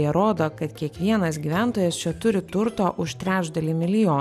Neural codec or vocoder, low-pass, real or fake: none; 14.4 kHz; real